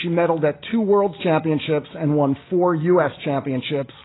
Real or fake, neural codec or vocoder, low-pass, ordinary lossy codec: real; none; 7.2 kHz; AAC, 16 kbps